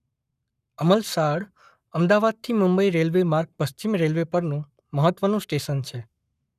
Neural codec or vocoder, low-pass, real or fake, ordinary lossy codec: codec, 44.1 kHz, 7.8 kbps, Pupu-Codec; 14.4 kHz; fake; none